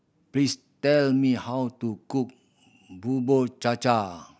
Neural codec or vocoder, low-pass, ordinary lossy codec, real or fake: none; none; none; real